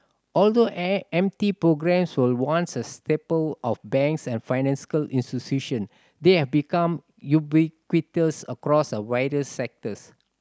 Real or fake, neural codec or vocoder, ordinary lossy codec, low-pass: real; none; none; none